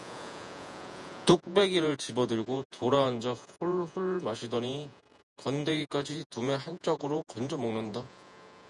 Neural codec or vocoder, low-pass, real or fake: vocoder, 48 kHz, 128 mel bands, Vocos; 10.8 kHz; fake